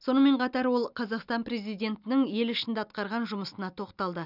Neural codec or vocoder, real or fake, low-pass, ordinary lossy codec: none; real; 5.4 kHz; none